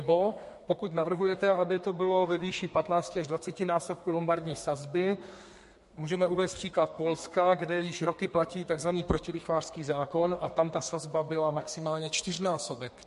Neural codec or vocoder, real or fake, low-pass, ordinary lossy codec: codec, 32 kHz, 1.9 kbps, SNAC; fake; 14.4 kHz; MP3, 48 kbps